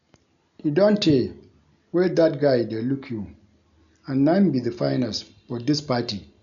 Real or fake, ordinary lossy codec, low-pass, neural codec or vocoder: real; none; 7.2 kHz; none